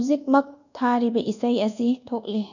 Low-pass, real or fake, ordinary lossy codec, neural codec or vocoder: 7.2 kHz; fake; none; codec, 24 kHz, 1.2 kbps, DualCodec